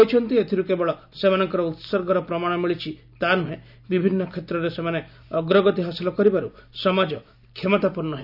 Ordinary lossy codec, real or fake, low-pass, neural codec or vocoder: none; real; 5.4 kHz; none